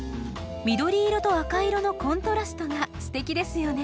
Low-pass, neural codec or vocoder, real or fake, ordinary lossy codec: none; none; real; none